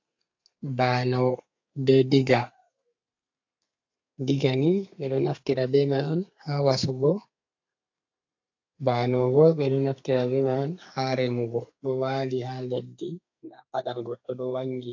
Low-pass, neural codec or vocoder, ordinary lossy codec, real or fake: 7.2 kHz; codec, 32 kHz, 1.9 kbps, SNAC; AAC, 48 kbps; fake